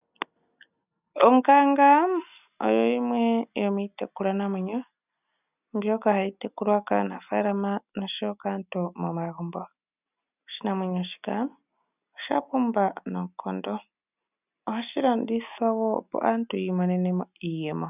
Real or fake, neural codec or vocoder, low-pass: real; none; 3.6 kHz